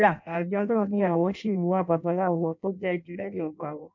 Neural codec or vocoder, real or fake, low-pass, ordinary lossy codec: codec, 16 kHz in and 24 kHz out, 0.6 kbps, FireRedTTS-2 codec; fake; 7.2 kHz; none